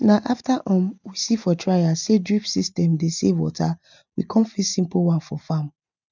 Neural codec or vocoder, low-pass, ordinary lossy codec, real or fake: none; 7.2 kHz; none; real